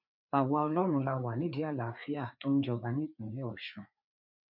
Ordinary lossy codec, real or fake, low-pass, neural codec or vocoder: none; fake; 5.4 kHz; codec, 16 kHz, 4 kbps, FreqCodec, larger model